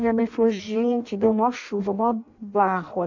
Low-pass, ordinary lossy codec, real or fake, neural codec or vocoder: 7.2 kHz; none; fake; codec, 16 kHz in and 24 kHz out, 0.6 kbps, FireRedTTS-2 codec